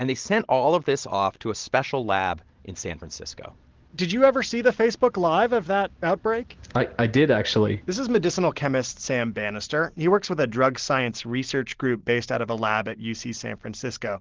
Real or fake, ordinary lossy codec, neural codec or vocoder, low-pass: real; Opus, 16 kbps; none; 7.2 kHz